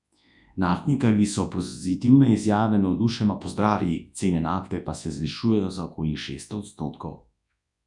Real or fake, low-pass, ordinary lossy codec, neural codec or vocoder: fake; 10.8 kHz; none; codec, 24 kHz, 0.9 kbps, WavTokenizer, large speech release